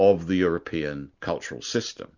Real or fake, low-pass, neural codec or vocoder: real; 7.2 kHz; none